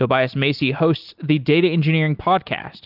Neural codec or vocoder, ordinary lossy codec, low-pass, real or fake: none; Opus, 24 kbps; 5.4 kHz; real